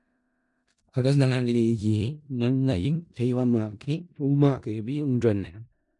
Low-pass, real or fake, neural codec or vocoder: 10.8 kHz; fake; codec, 16 kHz in and 24 kHz out, 0.4 kbps, LongCat-Audio-Codec, four codebook decoder